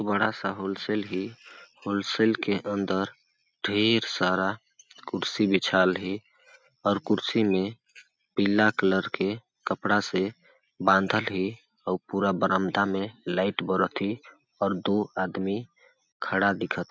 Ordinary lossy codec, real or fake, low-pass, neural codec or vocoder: none; real; none; none